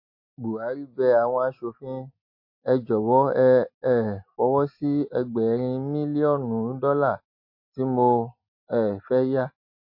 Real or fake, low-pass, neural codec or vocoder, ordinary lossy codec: real; 5.4 kHz; none; MP3, 32 kbps